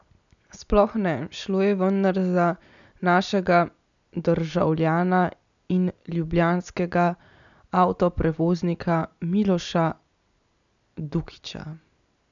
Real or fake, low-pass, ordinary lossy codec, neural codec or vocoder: real; 7.2 kHz; none; none